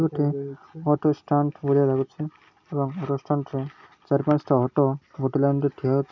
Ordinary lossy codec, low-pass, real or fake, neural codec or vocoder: none; 7.2 kHz; real; none